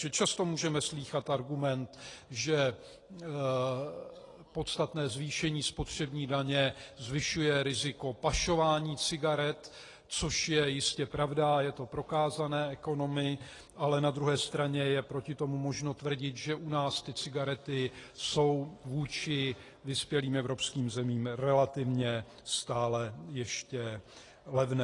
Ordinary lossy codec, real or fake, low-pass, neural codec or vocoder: AAC, 32 kbps; real; 10.8 kHz; none